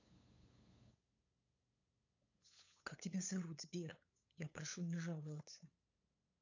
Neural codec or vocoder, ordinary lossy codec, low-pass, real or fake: codec, 16 kHz, 8 kbps, FunCodec, trained on LibriTTS, 25 frames a second; AAC, 48 kbps; 7.2 kHz; fake